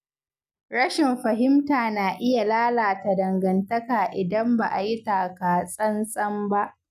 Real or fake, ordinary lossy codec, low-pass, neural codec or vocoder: real; none; 14.4 kHz; none